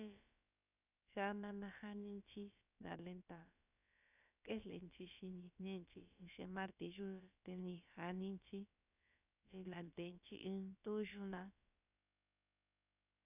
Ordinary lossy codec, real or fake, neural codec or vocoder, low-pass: none; fake; codec, 16 kHz, about 1 kbps, DyCAST, with the encoder's durations; 3.6 kHz